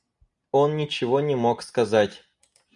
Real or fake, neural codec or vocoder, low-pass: real; none; 10.8 kHz